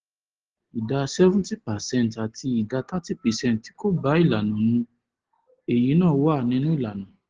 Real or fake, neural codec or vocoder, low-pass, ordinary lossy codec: real; none; 7.2 kHz; Opus, 16 kbps